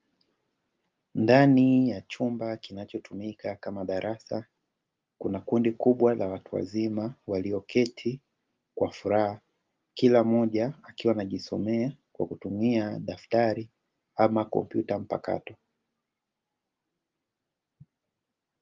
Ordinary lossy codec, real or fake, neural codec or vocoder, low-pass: Opus, 24 kbps; real; none; 7.2 kHz